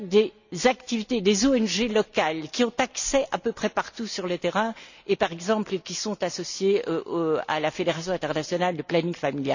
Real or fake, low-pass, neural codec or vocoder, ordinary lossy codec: real; 7.2 kHz; none; none